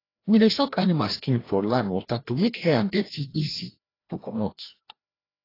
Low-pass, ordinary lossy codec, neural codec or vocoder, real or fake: 5.4 kHz; AAC, 24 kbps; codec, 16 kHz, 1 kbps, FreqCodec, larger model; fake